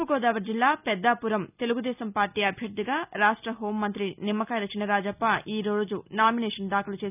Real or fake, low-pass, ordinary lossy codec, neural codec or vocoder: real; 3.6 kHz; none; none